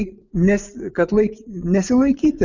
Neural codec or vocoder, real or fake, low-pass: vocoder, 24 kHz, 100 mel bands, Vocos; fake; 7.2 kHz